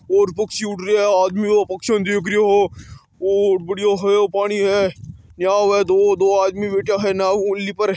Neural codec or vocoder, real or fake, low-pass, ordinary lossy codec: none; real; none; none